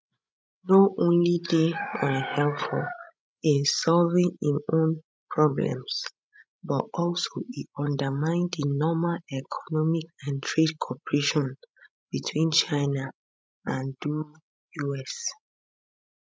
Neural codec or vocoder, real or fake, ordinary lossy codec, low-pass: codec, 16 kHz, 16 kbps, FreqCodec, larger model; fake; none; none